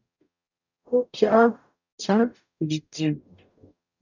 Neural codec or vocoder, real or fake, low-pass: codec, 44.1 kHz, 0.9 kbps, DAC; fake; 7.2 kHz